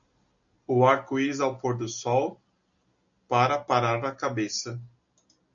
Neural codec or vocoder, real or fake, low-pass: none; real; 7.2 kHz